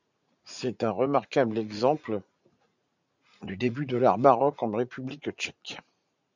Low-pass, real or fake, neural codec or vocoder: 7.2 kHz; fake; vocoder, 44.1 kHz, 80 mel bands, Vocos